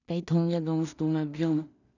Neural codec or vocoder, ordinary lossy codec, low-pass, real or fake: codec, 16 kHz in and 24 kHz out, 0.4 kbps, LongCat-Audio-Codec, two codebook decoder; none; 7.2 kHz; fake